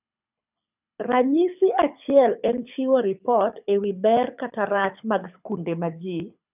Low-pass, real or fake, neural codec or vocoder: 3.6 kHz; fake; codec, 24 kHz, 6 kbps, HILCodec